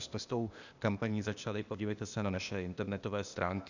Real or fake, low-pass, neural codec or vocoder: fake; 7.2 kHz; codec, 16 kHz, 0.8 kbps, ZipCodec